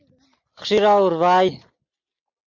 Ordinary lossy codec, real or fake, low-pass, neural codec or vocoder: MP3, 48 kbps; real; 7.2 kHz; none